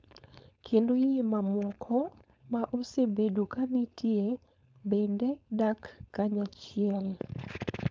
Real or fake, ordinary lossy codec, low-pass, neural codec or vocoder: fake; none; none; codec, 16 kHz, 4.8 kbps, FACodec